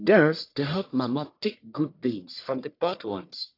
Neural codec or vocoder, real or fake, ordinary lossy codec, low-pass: codec, 44.1 kHz, 1.7 kbps, Pupu-Codec; fake; AAC, 32 kbps; 5.4 kHz